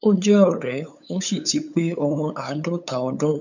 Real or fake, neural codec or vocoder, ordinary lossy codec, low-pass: fake; codec, 16 kHz, 8 kbps, FunCodec, trained on LibriTTS, 25 frames a second; none; 7.2 kHz